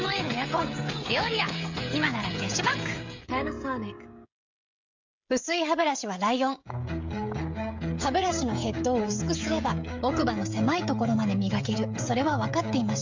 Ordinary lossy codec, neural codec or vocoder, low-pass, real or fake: MP3, 64 kbps; codec, 16 kHz, 16 kbps, FreqCodec, smaller model; 7.2 kHz; fake